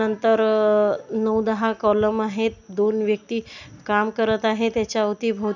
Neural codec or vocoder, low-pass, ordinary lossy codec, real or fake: none; 7.2 kHz; none; real